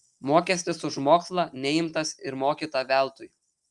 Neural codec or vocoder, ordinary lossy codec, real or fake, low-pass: none; Opus, 32 kbps; real; 10.8 kHz